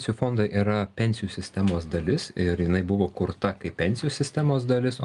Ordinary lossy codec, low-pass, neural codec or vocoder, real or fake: Opus, 32 kbps; 10.8 kHz; none; real